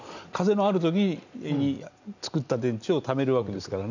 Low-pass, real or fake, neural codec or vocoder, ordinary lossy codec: 7.2 kHz; real; none; none